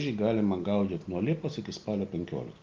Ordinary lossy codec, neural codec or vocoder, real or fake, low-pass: Opus, 32 kbps; none; real; 7.2 kHz